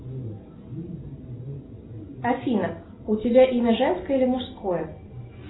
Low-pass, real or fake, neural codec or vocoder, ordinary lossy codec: 7.2 kHz; real; none; AAC, 16 kbps